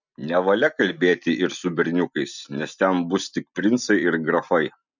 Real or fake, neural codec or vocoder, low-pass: real; none; 7.2 kHz